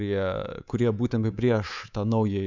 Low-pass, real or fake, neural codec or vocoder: 7.2 kHz; fake; codec, 24 kHz, 3.1 kbps, DualCodec